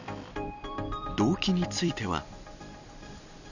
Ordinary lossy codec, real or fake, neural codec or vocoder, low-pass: none; fake; vocoder, 44.1 kHz, 128 mel bands every 256 samples, BigVGAN v2; 7.2 kHz